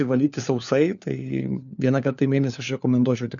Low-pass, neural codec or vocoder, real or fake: 7.2 kHz; codec, 16 kHz, 4 kbps, FunCodec, trained on LibriTTS, 50 frames a second; fake